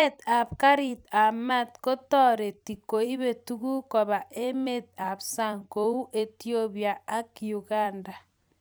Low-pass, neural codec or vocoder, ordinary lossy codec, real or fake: none; vocoder, 44.1 kHz, 128 mel bands every 256 samples, BigVGAN v2; none; fake